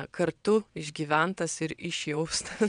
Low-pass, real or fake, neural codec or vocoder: 9.9 kHz; fake; vocoder, 22.05 kHz, 80 mel bands, WaveNeXt